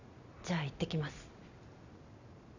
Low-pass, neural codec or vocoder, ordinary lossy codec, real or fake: 7.2 kHz; none; none; real